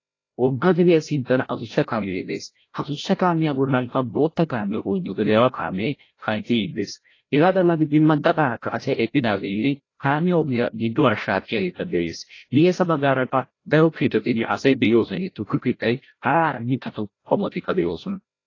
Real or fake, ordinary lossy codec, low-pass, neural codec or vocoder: fake; AAC, 32 kbps; 7.2 kHz; codec, 16 kHz, 0.5 kbps, FreqCodec, larger model